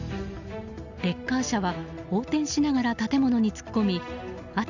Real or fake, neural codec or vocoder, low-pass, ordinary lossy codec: real; none; 7.2 kHz; none